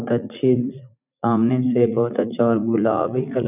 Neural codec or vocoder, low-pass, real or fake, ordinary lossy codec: vocoder, 44.1 kHz, 80 mel bands, Vocos; 3.6 kHz; fake; none